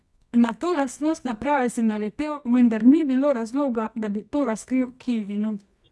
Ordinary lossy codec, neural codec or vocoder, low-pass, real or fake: none; codec, 24 kHz, 0.9 kbps, WavTokenizer, medium music audio release; none; fake